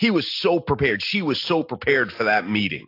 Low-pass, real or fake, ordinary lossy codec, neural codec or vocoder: 5.4 kHz; real; AAC, 32 kbps; none